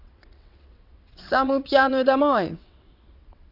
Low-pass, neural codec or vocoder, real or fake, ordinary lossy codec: 5.4 kHz; vocoder, 22.05 kHz, 80 mel bands, WaveNeXt; fake; none